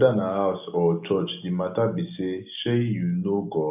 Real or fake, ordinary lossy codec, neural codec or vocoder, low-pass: real; none; none; 3.6 kHz